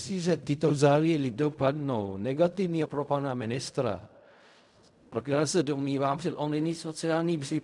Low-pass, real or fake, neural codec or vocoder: 10.8 kHz; fake; codec, 16 kHz in and 24 kHz out, 0.4 kbps, LongCat-Audio-Codec, fine tuned four codebook decoder